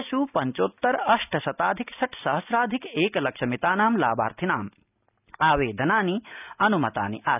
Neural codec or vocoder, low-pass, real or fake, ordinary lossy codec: none; 3.6 kHz; real; none